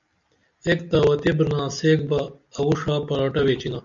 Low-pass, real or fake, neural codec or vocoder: 7.2 kHz; real; none